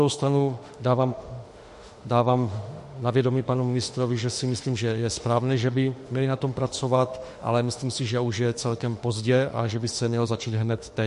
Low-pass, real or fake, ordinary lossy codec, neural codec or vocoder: 14.4 kHz; fake; MP3, 48 kbps; autoencoder, 48 kHz, 32 numbers a frame, DAC-VAE, trained on Japanese speech